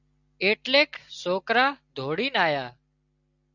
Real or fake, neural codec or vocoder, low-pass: real; none; 7.2 kHz